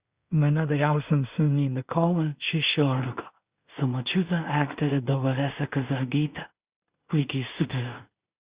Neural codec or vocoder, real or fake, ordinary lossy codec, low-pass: codec, 16 kHz in and 24 kHz out, 0.4 kbps, LongCat-Audio-Codec, two codebook decoder; fake; Opus, 64 kbps; 3.6 kHz